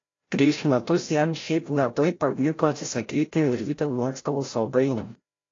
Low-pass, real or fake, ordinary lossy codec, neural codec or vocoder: 7.2 kHz; fake; AAC, 32 kbps; codec, 16 kHz, 0.5 kbps, FreqCodec, larger model